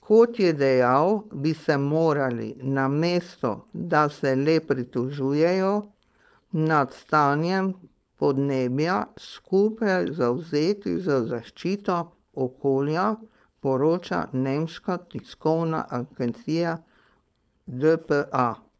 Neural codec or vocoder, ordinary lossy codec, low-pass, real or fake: codec, 16 kHz, 4.8 kbps, FACodec; none; none; fake